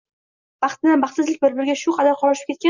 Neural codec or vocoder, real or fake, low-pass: none; real; 7.2 kHz